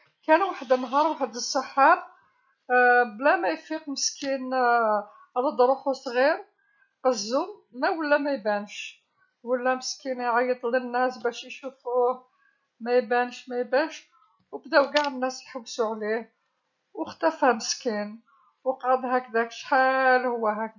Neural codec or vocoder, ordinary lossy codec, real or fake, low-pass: none; none; real; 7.2 kHz